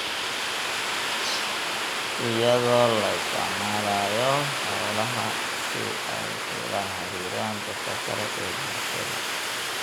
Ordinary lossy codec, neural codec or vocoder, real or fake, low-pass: none; none; real; none